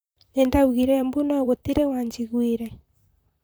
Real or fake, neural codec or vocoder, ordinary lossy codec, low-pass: fake; vocoder, 44.1 kHz, 128 mel bands, Pupu-Vocoder; none; none